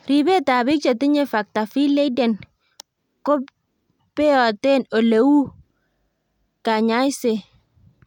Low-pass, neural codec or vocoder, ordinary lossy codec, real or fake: 19.8 kHz; none; none; real